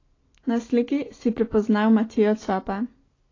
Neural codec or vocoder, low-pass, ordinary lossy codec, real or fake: none; 7.2 kHz; AAC, 32 kbps; real